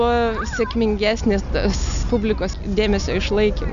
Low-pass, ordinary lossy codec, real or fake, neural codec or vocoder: 7.2 kHz; AAC, 96 kbps; real; none